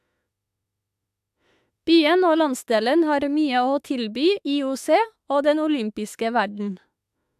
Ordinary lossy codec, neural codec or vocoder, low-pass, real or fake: AAC, 96 kbps; autoencoder, 48 kHz, 32 numbers a frame, DAC-VAE, trained on Japanese speech; 14.4 kHz; fake